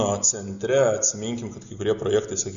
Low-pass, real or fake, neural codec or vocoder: 7.2 kHz; real; none